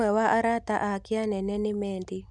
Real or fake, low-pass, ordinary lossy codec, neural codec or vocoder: real; 10.8 kHz; none; none